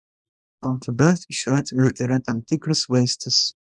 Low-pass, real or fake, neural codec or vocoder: 10.8 kHz; fake; codec, 24 kHz, 0.9 kbps, WavTokenizer, small release